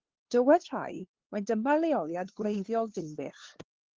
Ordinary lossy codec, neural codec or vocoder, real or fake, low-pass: Opus, 16 kbps; codec, 16 kHz, 2 kbps, FunCodec, trained on LibriTTS, 25 frames a second; fake; 7.2 kHz